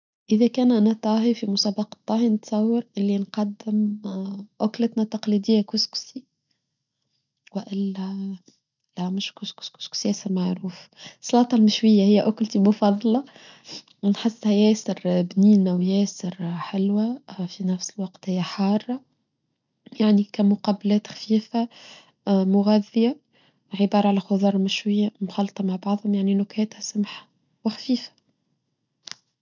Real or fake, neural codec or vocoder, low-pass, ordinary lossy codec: real; none; 7.2 kHz; none